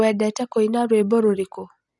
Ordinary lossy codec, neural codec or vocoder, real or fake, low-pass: none; none; real; 10.8 kHz